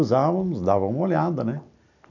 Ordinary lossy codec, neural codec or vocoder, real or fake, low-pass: none; none; real; 7.2 kHz